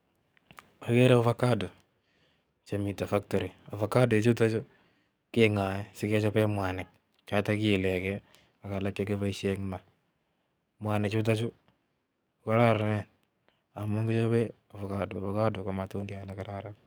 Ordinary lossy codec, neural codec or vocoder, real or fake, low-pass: none; codec, 44.1 kHz, 7.8 kbps, DAC; fake; none